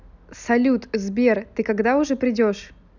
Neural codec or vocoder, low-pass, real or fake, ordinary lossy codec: none; 7.2 kHz; real; none